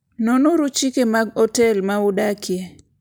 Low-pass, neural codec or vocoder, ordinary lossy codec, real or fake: none; none; none; real